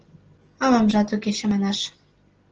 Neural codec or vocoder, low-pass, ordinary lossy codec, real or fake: none; 7.2 kHz; Opus, 16 kbps; real